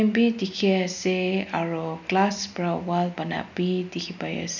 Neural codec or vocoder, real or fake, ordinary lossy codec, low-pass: none; real; none; 7.2 kHz